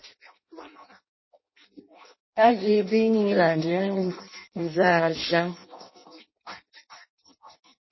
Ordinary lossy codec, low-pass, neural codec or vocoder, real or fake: MP3, 24 kbps; 7.2 kHz; codec, 16 kHz in and 24 kHz out, 0.6 kbps, FireRedTTS-2 codec; fake